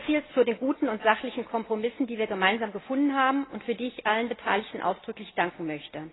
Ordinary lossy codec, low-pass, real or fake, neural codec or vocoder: AAC, 16 kbps; 7.2 kHz; real; none